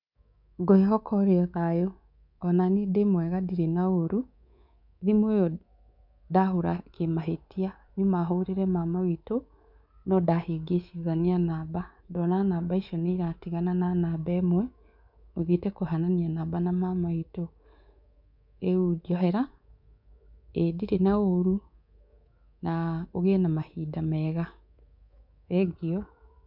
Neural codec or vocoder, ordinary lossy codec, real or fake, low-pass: codec, 24 kHz, 3.1 kbps, DualCodec; none; fake; 5.4 kHz